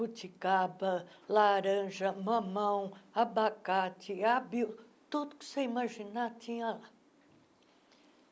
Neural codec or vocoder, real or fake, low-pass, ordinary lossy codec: none; real; none; none